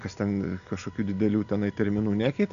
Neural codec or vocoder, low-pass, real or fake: none; 7.2 kHz; real